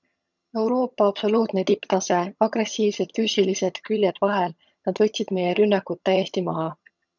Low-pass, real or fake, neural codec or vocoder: 7.2 kHz; fake; vocoder, 22.05 kHz, 80 mel bands, HiFi-GAN